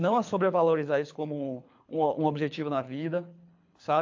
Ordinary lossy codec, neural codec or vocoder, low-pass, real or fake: AAC, 48 kbps; codec, 24 kHz, 3 kbps, HILCodec; 7.2 kHz; fake